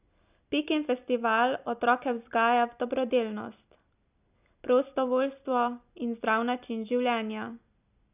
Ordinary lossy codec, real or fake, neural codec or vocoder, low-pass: none; real; none; 3.6 kHz